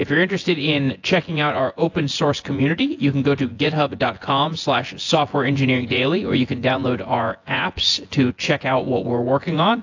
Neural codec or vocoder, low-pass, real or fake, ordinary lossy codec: vocoder, 24 kHz, 100 mel bands, Vocos; 7.2 kHz; fake; AAC, 48 kbps